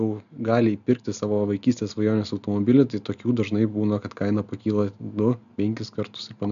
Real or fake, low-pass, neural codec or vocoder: real; 7.2 kHz; none